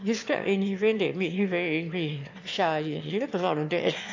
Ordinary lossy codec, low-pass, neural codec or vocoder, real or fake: AAC, 48 kbps; 7.2 kHz; autoencoder, 22.05 kHz, a latent of 192 numbers a frame, VITS, trained on one speaker; fake